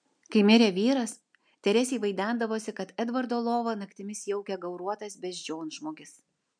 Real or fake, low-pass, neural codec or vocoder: real; 9.9 kHz; none